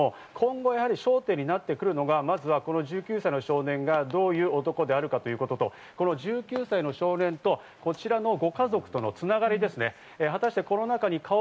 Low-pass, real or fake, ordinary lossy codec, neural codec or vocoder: none; real; none; none